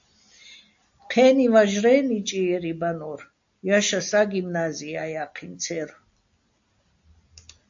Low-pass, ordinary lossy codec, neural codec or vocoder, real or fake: 7.2 kHz; AAC, 64 kbps; none; real